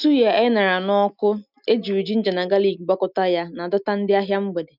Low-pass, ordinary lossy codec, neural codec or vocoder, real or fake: 5.4 kHz; none; none; real